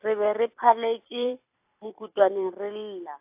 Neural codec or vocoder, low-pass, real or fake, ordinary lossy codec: vocoder, 44.1 kHz, 128 mel bands every 256 samples, BigVGAN v2; 3.6 kHz; fake; AAC, 32 kbps